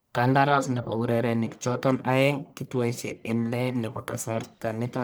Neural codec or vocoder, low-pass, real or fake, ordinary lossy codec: codec, 44.1 kHz, 1.7 kbps, Pupu-Codec; none; fake; none